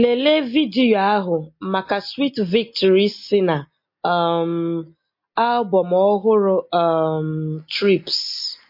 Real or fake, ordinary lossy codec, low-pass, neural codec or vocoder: real; MP3, 32 kbps; 5.4 kHz; none